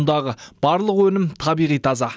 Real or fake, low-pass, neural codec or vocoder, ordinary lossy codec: real; none; none; none